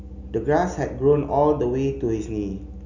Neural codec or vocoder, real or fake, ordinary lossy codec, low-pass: none; real; none; 7.2 kHz